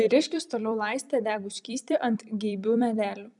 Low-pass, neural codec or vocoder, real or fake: 10.8 kHz; vocoder, 44.1 kHz, 128 mel bands every 256 samples, BigVGAN v2; fake